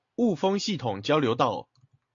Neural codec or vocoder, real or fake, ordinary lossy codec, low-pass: none; real; AAC, 48 kbps; 7.2 kHz